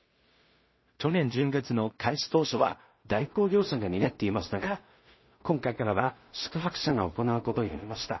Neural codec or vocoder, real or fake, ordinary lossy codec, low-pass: codec, 16 kHz in and 24 kHz out, 0.4 kbps, LongCat-Audio-Codec, two codebook decoder; fake; MP3, 24 kbps; 7.2 kHz